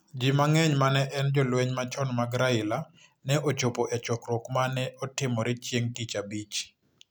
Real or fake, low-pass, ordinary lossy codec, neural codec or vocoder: real; none; none; none